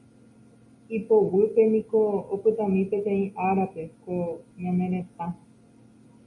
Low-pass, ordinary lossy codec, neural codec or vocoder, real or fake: 10.8 kHz; MP3, 48 kbps; none; real